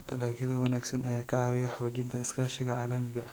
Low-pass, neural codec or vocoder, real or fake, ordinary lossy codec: none; codec, 44.1 kHz, 2.6 kbps, SNAC; fake; none